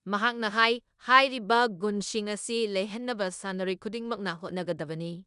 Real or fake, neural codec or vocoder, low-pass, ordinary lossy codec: fake; codec, 16 kHz in and 24 kHz out, 0.9 kbps, LongCat-Audio-Codec, four codebook decoder; 10.8 kHz; none